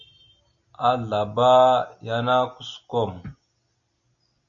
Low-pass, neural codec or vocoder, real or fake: 7.2 kHz; none; real